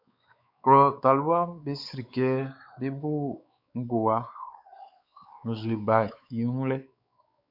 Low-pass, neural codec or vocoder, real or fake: 5.4 kHz; codec, 16 kHz, 4 kbps, X-Codec, WavLM features, trained on Multilingual LibriSpeech; fake